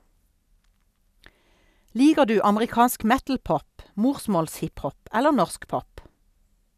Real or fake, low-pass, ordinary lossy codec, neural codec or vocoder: real; 14.4 kHz; none; none